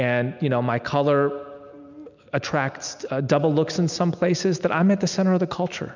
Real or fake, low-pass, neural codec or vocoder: real; 7.2 kHz; none